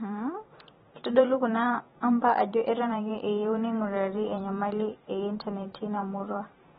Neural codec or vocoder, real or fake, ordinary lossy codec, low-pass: vocoder, 44.1 kHz, 128 mel bands every 512 samples, BigVGAN v2; fake; AAC, 16 kbps; 19.8 kHz